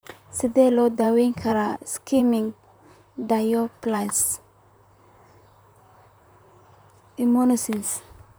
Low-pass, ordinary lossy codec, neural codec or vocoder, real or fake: none; none; vocoder, 44.1 kHz, 128 mel bands, Pupu-Vocoder; fake